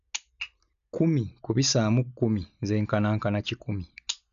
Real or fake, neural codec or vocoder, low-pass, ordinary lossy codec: real; none; 7.2 kHz; none